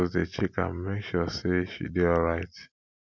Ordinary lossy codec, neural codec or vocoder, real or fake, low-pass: none; none; real; 7.2 kHz